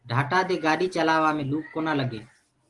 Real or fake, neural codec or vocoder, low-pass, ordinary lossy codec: real; none; 10.8 kHz; Opus, 24 kbps